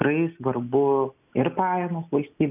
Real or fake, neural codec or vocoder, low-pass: real; none; 3.6 kHz